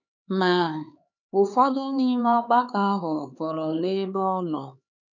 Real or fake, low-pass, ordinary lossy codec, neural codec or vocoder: fake; 7.2 kHz; none; codec, 16 kHz, 4 kbps, X-Codec, HuBERT features, trained on LibriSpeech